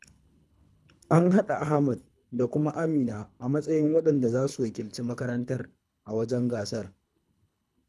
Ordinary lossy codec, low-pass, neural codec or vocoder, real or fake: none; none; codec, 24 kHz, 3 kbps, HILCodec; fake